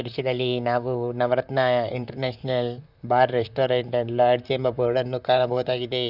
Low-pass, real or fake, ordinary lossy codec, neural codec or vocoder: 5.4 kHz; fake; none; vocoder, 44.1 kHz, 128 mel bands, Pupu-Vocoder